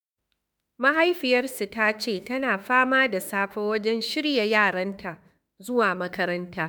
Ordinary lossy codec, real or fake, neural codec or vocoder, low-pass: none; fake; autoencoder, 48 kHz, 32 numbers a frame, DAC-VAE, trained on Japanese speech; none